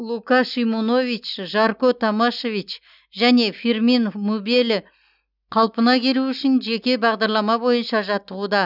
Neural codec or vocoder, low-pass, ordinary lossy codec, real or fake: none; 5.4 kHz; none; real